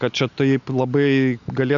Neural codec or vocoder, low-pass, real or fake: none; 7.2 kHz; real